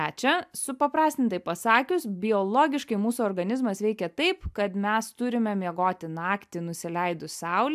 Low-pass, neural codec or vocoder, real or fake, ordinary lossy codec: 14.4 kHz; none; real; AAC, 96 kbps